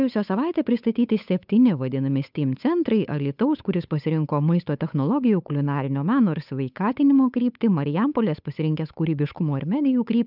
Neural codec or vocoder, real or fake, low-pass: codec, 16 kHz, 8 kbps, FunCodec, trained on Chinese and English, 25 frames a second; fake; 5.4 kHz